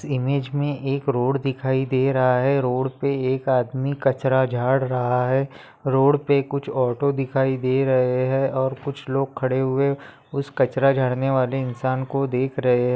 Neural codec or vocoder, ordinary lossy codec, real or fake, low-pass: none; none; real; none